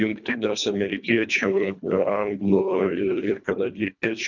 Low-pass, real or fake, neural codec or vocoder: 7.2 kHz; fake; codec, 24 kHz, 1.5 kbps, HILCodec